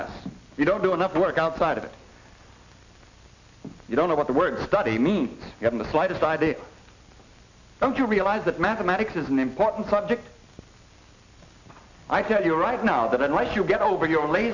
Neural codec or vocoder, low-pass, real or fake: none; 7.2 kHz; real